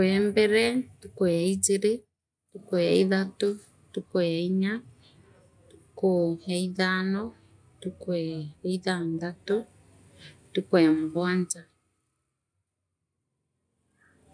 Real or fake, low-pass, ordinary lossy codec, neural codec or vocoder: real; 9.9 kHz; MP3, 96 kbps; none